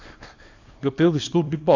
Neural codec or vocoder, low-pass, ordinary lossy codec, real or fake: codec, 24 kHz, 0.9 kbps, WavTokenizer, small release; 7.2 kHz; AAC, 48 kbps; fake